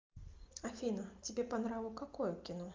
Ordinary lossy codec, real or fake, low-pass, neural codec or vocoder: Opus, 24 kbps; real; 7.2 kHz; none